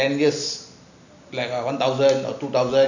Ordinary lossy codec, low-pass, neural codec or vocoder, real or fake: none; 7.2 kHz; none; real